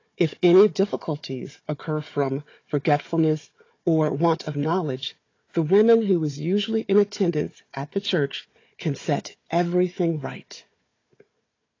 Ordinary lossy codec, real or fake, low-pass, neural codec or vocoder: AAC, 32 kbps; fake; 7.2 kHz; codec, 16 kHz, 4 kbps, FunCodec, trained on Chinese and English, 50 frames a second